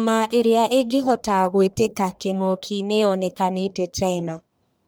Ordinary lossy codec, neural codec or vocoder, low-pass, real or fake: none; codec, 44.1 kHz, 1.7 kbps, Pupu-Codec; none; fake